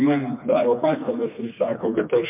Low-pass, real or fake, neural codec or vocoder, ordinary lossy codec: 3.6 kHz; fake; codec, 16 kHz, 2 kbps, FreqCodec, smaller model; MP3, 32 kbps